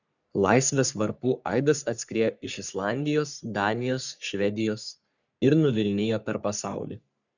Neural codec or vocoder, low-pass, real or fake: codec, 44.1 kHz, 3.4 kbps, Pupu-Codec; 7.2 kHz; fake